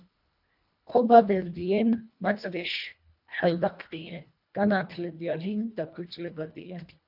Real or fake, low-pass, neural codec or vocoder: fake; 5.4 kHz; codec, 24 kHz, 1.5 kbps, HILCodec